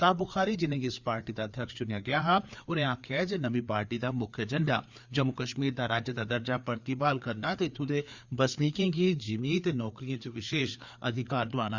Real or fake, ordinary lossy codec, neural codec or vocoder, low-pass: fake; Opus, 64 kbps; codec, 16 kHz, 4 kbps, FreqCodec, larger model; 7.2 kHz